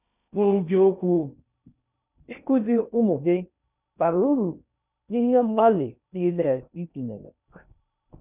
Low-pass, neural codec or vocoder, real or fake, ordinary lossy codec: 3.6 kHz; codec, 16 kHz in and 24 kHz out, 0.6 kbps, FocalCodec, streaming, 2048 codes; fake; none